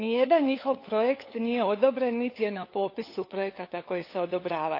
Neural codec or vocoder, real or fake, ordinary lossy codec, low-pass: codec, 16 kHz, 8 kbps, FunCodec, trained on LibriTTS, 25 frames a second; fake; AAC, 32 kbps; 5.4 kHz